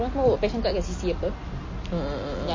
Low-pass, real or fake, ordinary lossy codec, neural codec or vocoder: 7.2 kHz; real; MP3, 32 kbps; none